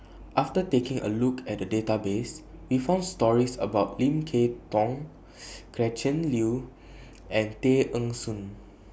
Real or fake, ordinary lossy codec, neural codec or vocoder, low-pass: real; none; none; none